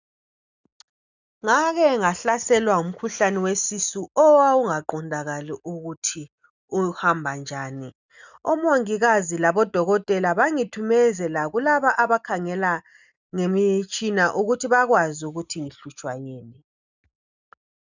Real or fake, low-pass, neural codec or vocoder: real; 7.2 kHz; none